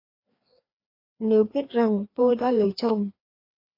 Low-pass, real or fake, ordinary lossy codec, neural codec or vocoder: 5.4 kHz; fake; AAC, 32 kbps; codec, 16 kHz, 2 kbps, FreqCodec, larger model